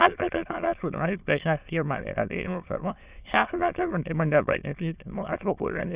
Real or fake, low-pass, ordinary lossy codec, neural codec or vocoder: fake; 3.6 kHz; Opus, 64 kbps; autoencoder, 22.05 kHz, a latent of 192 numbers a frame, VITS, trained on many speakers